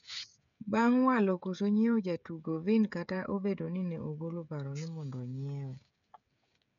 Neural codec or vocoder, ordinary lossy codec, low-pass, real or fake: codec, 16 kHz, 16 kbps, FreqCodec, smaller model; MP3, 96 kbps; 7.2 kHz; fake